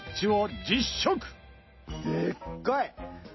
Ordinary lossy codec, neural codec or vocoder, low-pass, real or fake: MP3, 24 kbps; none; 7.2 kHz; real